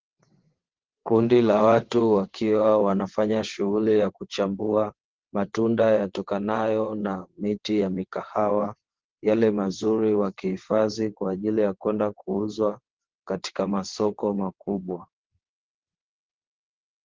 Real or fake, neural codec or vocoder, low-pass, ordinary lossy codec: fake; vocoder, 22.05 kHz, 80 mel bands, WaveNeXt; 7.2 kHz; Opus, 16 kbps